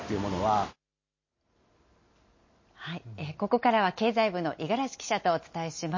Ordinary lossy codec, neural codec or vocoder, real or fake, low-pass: MP3, 32 kbps; none; real; 7.2 kHz